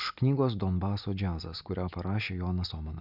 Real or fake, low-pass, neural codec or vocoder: real; 5.4 kHz; none